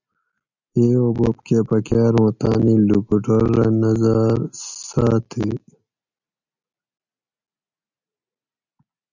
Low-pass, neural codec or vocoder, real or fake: 7.2 kHz; none; real